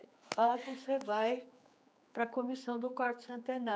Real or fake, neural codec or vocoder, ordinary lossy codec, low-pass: fake; codec, 16 kHz, 4 kbps, X-Codec, HuBERT features, trained on general audio; none; none